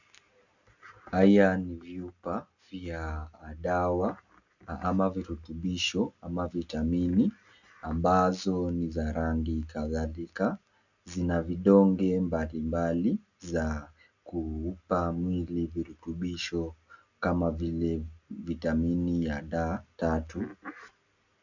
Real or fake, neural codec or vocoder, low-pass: real; none; 7.2 kHz